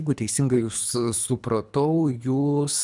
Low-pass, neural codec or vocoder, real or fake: 10.8 kHz; codec, 24 kHz, 3 kbps, HILCodec; fake